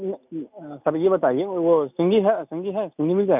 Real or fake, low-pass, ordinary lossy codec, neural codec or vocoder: real; 3.6 kHz; none; none